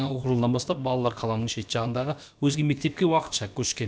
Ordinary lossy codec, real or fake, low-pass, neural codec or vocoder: none; fake; none; codec, 16 kHz, about 1 kbps, DyCAST, with the encoder's durations